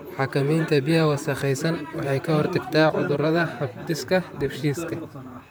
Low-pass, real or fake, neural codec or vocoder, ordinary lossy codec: none; fake; vocoder, 44.1 kHz, 128 mel bands, Pupu-Vocoder; none